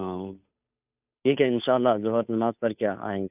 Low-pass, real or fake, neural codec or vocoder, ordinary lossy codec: 3.6 kHz; fake; codec, 16 kHz, 2 kbps, FunCodec, trained on Chinese and English, 25 frames a second; none